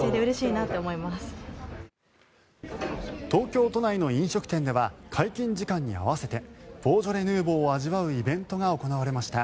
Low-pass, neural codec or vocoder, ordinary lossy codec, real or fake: none; none; none; real